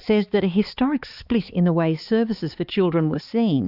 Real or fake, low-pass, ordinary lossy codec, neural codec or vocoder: fake; 5.4 kHz; Opus, 64 kbps; codec, 16 kHz, 4 kbps, X-Codec, HuBERT features, trained on balanced general audio